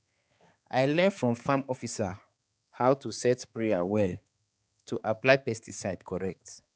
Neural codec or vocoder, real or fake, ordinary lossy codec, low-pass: codec, 16 kHz, 4 kbps, X-Codec, HuBERT features, trained on balanced general audio; fake; none; none